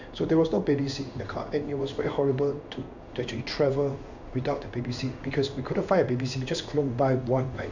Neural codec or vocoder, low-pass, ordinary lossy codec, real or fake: codec, 16 kHz in and 24 kHz out, 1 kbps, XY-Tokenizer; 7.2 kHz; none; fake